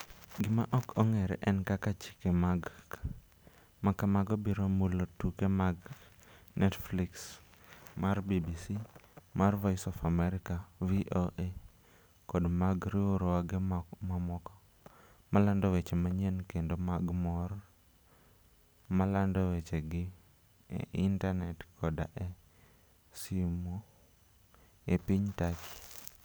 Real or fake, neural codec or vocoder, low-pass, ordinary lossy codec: real; none; none; none